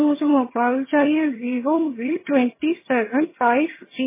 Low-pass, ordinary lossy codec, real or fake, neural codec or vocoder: 3.6 kHz; MP3, 16 kbps; fake; vocoder, 22.05 kHz, 80 mel bands, HiFi-GAN